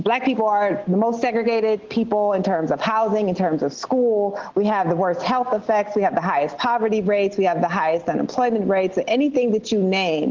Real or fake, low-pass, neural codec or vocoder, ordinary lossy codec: real; 7.2 kHz; none; Opus, 16 kbps